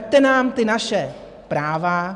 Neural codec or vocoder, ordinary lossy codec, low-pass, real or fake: none; AAC, 96 kbps; 10.8 kHz; real